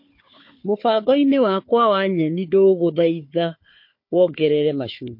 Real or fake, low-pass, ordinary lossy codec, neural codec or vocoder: fake; 5.4 kHz; MP3, 32 kbps; codec, 16 kHz, 4 kbps, FunCodec, trained on Chinese and English, 50 frames a second